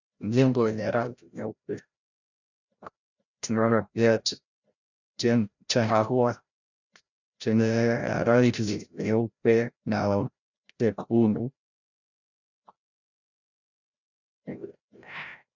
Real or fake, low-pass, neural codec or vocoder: fake; 7.2 kHz; codec, 16 kHz, 0.5 kbps, FreqCodec, larger model